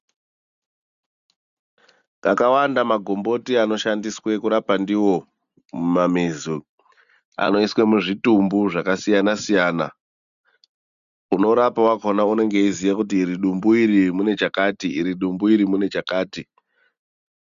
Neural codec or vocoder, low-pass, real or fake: none; 7.2 kHz; real